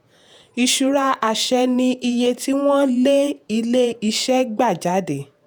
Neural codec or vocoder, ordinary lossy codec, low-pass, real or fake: vocoder, 48 kHz, 128 mel bands, Vocos; none; none; fake